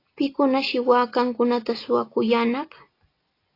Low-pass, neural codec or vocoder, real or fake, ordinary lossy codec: 5.4 kHz; none; real; AAC, 32 kbps